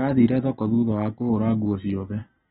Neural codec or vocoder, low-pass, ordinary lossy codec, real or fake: none; 9.9 kHz; AAC, 16 kbps; real